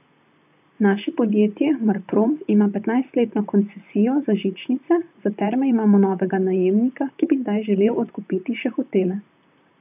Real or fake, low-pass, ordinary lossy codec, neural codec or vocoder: fake; 3.6 kHz; none; vocoder, 44.1 kHz, 128 mel bands, Pupu-Vocoder